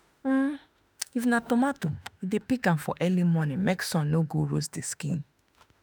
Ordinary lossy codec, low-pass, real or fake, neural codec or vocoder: none; none; fake; autoencoder, 48 kHz, 32 numbers a frame, DAC-VAE, trained on Japanese speech